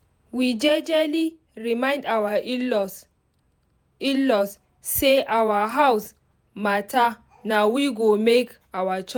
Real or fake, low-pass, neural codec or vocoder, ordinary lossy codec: fake; none; vocoder, 48 kHz, 128 mel bands, Vocos; none